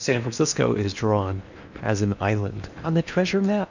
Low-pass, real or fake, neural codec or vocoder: 7.2 kHz; fake; codec, 16 kHz in and 24 kHz out, 0.8 kbps, FocalCodec, streaming, 65536 codes